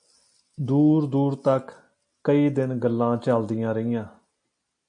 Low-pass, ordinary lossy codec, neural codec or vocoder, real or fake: 9.9 kHz; AAC, 64 kbps; none; real